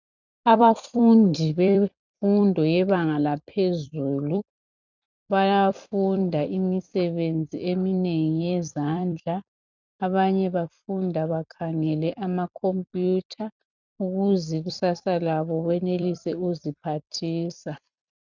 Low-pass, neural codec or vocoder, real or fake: 7.2 kHz; vocoder, 44.1 kHz, 128 mel bands every 256 samples, BigVGAN v2; fake